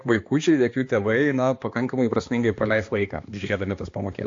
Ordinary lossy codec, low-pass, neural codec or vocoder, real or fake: AAC, 48 kbps; 7.2 kHz; codec, 16 kHz, 2 kbps, X-Codec, HuBERT features, trained on balanced general audio; fake